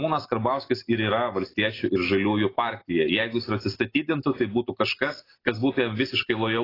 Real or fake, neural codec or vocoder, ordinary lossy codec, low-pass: real; none; AAC, 24 kbps; 5.4 kHz